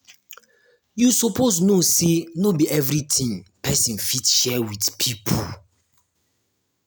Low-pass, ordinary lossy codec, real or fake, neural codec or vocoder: none; none; real; none